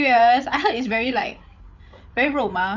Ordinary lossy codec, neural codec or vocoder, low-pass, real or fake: none; codec, 16 kHz, 8 kbps, FreqCodec, larger model; 7.2 kHz; fake